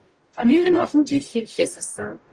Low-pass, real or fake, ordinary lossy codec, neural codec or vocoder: 10.8 kHz; fake; Opus, 24 kbps; codec, 44.1 kHz, 0.9 kbps, DAC